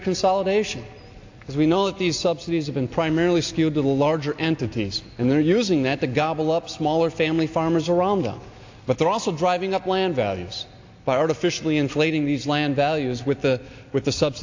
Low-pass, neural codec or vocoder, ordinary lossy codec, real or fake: 7.2 kHz; none; AAC, 48 kbps; real